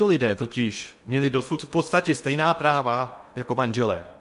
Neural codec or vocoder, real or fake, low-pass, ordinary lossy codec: codec, 16 kHz in and 24 kHz out, 0.8 kbps, FocalCodec, streaming, 65536 codes; fake; 10.8 kHz; MP3, 64 kbps